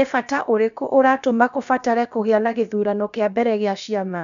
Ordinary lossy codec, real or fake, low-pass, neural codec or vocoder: none; fake; 7.2 kHz; codec, 16 kHz, about 1 kbps, DyCAST, with the encoder's durations